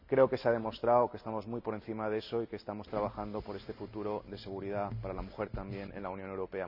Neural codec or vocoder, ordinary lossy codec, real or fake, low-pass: none; none; real; 5.4 kHz